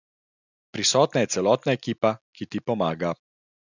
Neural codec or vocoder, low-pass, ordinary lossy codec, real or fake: none; 7.2 kHz; none; real